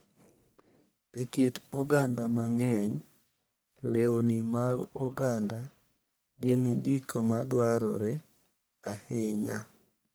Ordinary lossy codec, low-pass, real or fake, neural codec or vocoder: none; none; fake; codec, 44.1 kHz, 1.7 kbps, Pupu-Codec